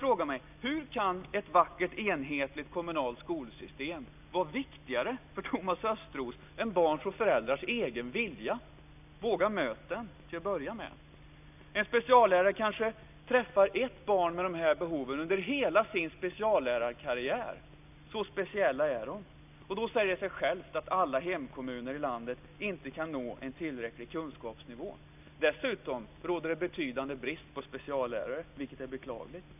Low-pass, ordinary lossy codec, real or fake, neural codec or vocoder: 3.6 kHz; none; real; none